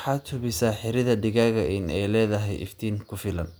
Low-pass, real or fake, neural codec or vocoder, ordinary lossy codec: none; real; none; none